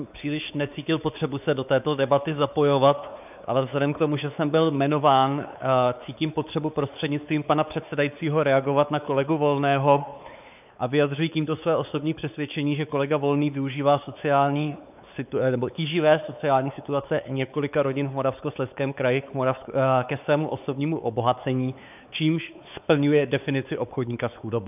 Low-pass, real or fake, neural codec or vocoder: 3.6 kHz; fake; codec, 16 kHz, 4 kbps, X-Codec, WavLM features, trained on Multilingual LibriSpeech